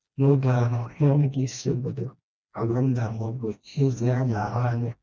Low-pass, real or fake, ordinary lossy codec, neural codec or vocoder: none; fake; none; codec, 16 kHz, 1 kbps, FreqCodec, smaller model